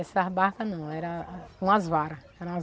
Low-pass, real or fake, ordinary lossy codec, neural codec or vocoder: none; real; none; none